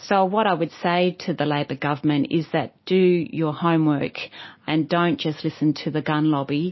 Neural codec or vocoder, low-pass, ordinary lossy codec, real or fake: none; 7.2 kHz; MP3, 24 kbps; real